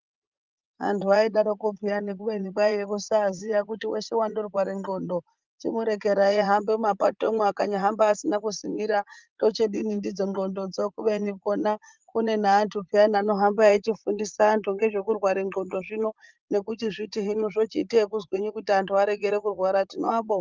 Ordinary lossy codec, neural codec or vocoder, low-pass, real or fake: Opus, 24 kbps; vocoder, 44.1 kHz, 80 mel bands, Vocos; 7.2 kHz; fake